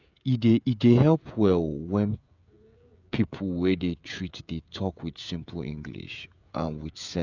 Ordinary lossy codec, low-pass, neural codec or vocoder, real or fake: none; 7.2 kHz; codec, 16 kHz, 16 kbps, FreqCodec, smaller model; fake